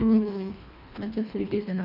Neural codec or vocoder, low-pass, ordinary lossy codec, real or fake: codec, 24 kHz, 1.5 kbps, HILCodec; 5.4 kHz; none; fake